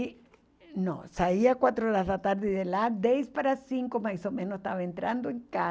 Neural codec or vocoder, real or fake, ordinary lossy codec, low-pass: none; real; none; none